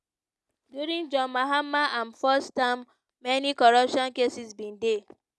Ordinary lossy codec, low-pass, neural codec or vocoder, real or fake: none; none; none; real